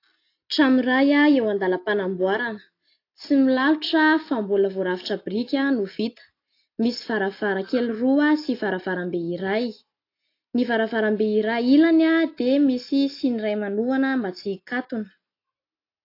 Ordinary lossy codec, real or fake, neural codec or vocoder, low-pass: AAC, 32 kbps; real; none; 5.4 kHz